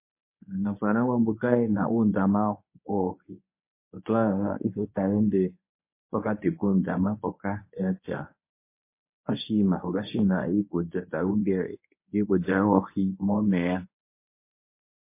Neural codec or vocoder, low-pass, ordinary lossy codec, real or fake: codec, 24 kHz, 0.9 kbps, WavTokenizer, medium speech release version 1; 3.6 kHz; MP3, 24 kbps; fake